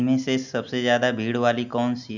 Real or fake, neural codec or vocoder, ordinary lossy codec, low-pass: real; none; none; 7.2 kHz